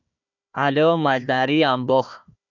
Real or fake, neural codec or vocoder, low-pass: fake; codec, 16 kHz, 1 kbps, FunCodec, trained on Chinese and English, 50 frames a second; 7.2 kHz